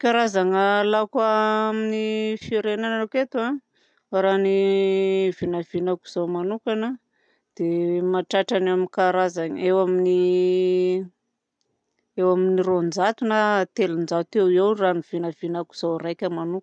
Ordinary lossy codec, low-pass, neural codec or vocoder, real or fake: none; 9.9 kHz; none; real